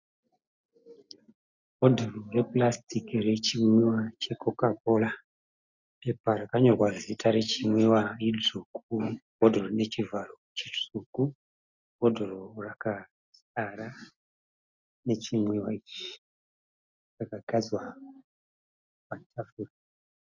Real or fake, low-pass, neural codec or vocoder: real; 7.2 kHz; none